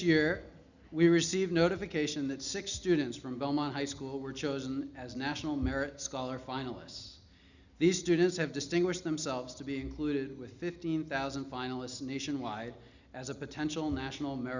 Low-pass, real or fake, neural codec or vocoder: 7.2 kHz; real; none